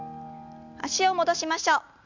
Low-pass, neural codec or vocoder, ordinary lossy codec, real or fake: 7.2 kHz; none; MP3, 64 kbps; real